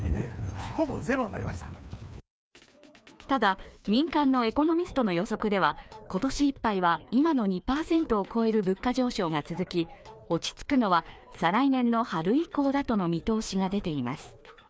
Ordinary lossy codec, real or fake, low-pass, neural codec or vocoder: none; fake; none; codec, 16 kHz, 2 kbps, FreqCodec, larger model